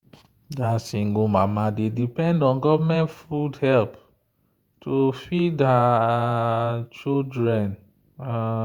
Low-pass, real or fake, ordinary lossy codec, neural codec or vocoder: 19.8 kHz; fake; Opus, 64 kbps; vocoder, 48 kHz, 128 mel bands, Vocos